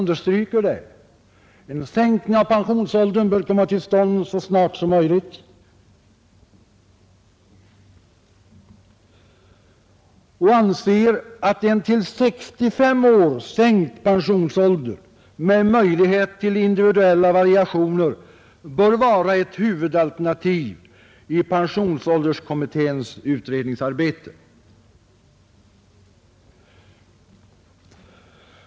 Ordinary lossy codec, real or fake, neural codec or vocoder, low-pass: none; real; none; none